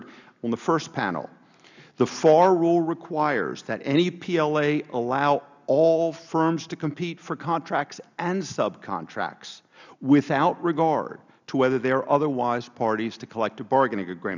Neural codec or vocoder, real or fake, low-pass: none; real; 7.2 kHz